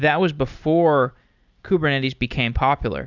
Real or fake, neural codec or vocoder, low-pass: real; none; 7.2 kHz